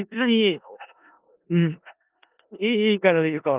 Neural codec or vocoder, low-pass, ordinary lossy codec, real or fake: codec, 16 kHz in and 24 kHz out, 0.4 kbps, LongCat-Audio-Codec, four codebook decoder; 3.6 kHz; Opus, 32 kbps; fake